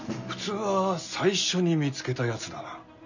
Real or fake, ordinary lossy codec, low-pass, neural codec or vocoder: real; none; 7.2 kHz; none